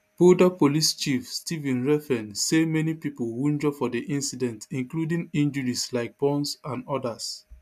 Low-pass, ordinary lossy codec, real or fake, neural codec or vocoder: 14.4 kHz; MP3, 96 kbps; real; none